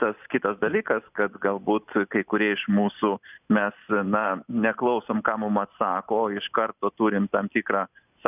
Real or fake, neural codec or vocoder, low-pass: real; none; 3.6 kHz